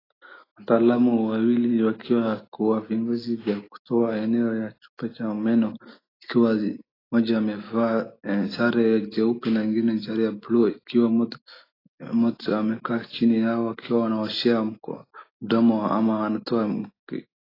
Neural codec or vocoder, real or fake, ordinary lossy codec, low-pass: none; real; AAC, 24 kbps; 5.4 kHz